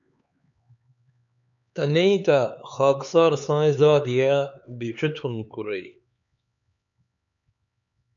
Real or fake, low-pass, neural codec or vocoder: fake; 7.2 kHz; codec, 16 kHz, 4 kbps, X-Codec, HuBERT features, trained on LibriSpeech